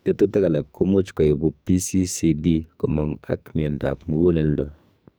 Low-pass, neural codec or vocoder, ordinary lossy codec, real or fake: none; codec, 44.1 kHz, 2.6 kbps, SNAC; none; fake